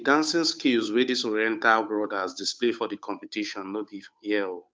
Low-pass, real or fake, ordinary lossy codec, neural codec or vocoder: none; fake; none; codec, 16 kHz, 8 kbps, FunCodec, trained on Chinese and English, 25 frames a second